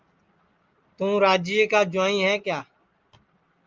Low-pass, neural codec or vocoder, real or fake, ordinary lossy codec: 7.2 kHz; none; real; Opus, 24 kbps